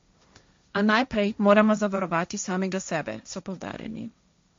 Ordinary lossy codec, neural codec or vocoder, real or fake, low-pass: MP3, 48 kbps; codec, 16 kHz, 1.1 kbps, Voila-Tokenizer; fake; 7.2 kHz